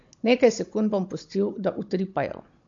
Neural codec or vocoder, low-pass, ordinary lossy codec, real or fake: codec, 16 kHz, 16 kbps, FunCodec, trained on Chinese and English, 50 frames a second; 7.2 kHz; MP3, 48 kbps; fake